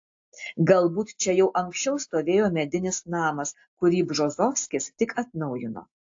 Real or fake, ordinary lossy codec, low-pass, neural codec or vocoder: real; AAC, 48 kbps; 7.2 kHz; none